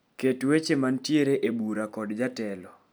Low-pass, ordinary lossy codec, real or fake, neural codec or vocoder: none; none; real; none